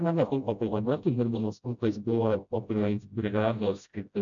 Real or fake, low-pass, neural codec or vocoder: fake; 7.2 kHz; codec, 16 kHz, 0.5 kbps, FreqCodec, smaller model